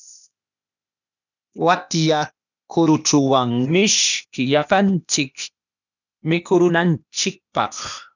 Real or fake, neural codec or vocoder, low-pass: fake; codec, 16 kHz, 0.8 kbps, ZipCodec; 7.2 kHz